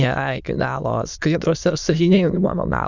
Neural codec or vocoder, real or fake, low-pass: autoencoder, 22.05 kHz, a latent of 192 numbers a frame, VITS, trained on many speakers; fake; 7.2 kHz